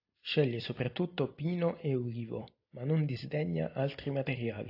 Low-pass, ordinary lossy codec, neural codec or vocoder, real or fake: 5.4 kHz; AAC, 32 kbps; none; real